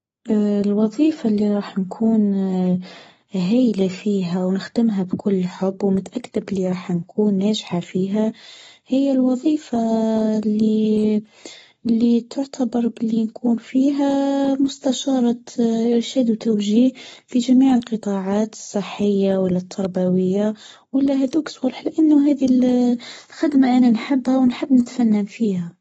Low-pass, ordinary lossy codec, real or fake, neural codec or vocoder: 19.8 kHz; AAC, 24 kbps; fake; codec, 44.1 kHz, 7.8 kbps, Pupu-Codec